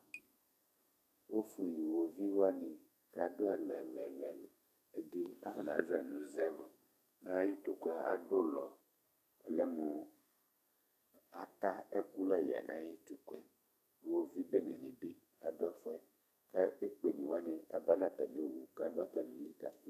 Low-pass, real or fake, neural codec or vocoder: 14.4 kHz; fake; codec, 32 kHz, 1.9 kbps, SNAC